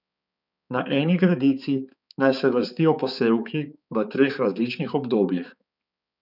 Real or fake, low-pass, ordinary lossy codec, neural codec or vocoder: fake; 5.4 kHz; none; codec, 16 kHz, 4 kbps, X-Codec, HuBERT features, trained on balanced general audio